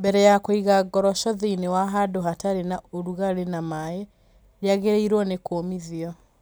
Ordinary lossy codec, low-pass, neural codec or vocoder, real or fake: none; none; none; real